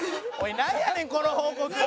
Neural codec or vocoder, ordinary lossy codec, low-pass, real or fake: none; none; none; real